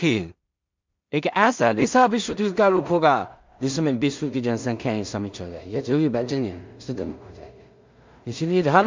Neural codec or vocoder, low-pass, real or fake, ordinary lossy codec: codec, 16 kHz in and 24 kHz out, 0.4 kbps, LongCat-Audio-Codec, two codebook decoder; 7.2 kHz; fake; MP3, 64 kbps